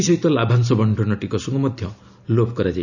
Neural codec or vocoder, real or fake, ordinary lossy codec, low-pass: none; real; none; 7.2 kHz